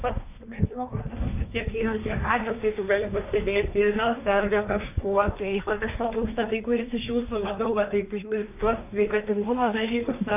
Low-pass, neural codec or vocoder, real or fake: 3.6 kHz; codec, 24 kHz, 1 kbps, SNAC; fake